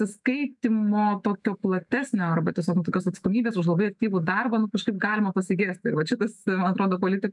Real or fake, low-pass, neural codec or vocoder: fake; 10.8 kHz; autoencoder, 48 kHz, 128 numbers a frame, DAC-VAE, trained on Japanese speech